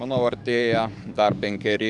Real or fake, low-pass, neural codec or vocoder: fake; 10.8 kHz; codec, 44.1 kHz, 7.8 kbps, DAC